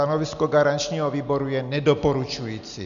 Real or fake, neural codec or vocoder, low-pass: real; none; 7.2 kHz